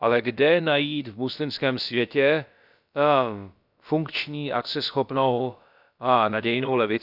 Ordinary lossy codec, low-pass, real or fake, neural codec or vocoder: none; 5.4 kHz; fake; codec, 16 kHz, about 1 kbps, DyCAST, with the encoder's durations